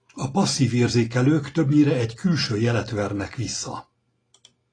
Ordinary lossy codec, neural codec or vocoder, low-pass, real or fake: AAC, 32 kbps; none; 9.9 kHz; real